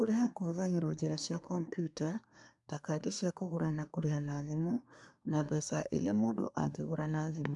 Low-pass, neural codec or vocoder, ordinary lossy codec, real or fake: 10.8 kHz; codec, 24 kHz, 1 kbps, SNAC; none; fake